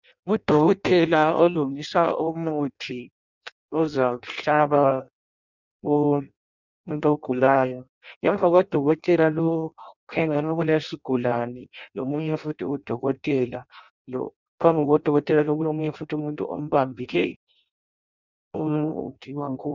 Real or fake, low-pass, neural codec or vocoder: fake; 7.2 kHz; codec, 16 kHz in and 24 kHz out, 0.6 kbps, FireRedTTS-2 codec